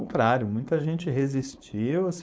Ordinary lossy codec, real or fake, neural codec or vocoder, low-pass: none; fake; codec, 16 kHz, 4.8 kbps, FACodec; none